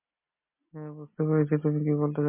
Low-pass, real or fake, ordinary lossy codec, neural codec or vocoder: 3.6 kHz; real; MP3, 24 kbps; none